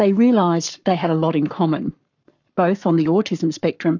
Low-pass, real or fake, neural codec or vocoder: 7.2 kHz; fake; codec, 44.1 kHz, 7.8 kbps, Pupu-Codec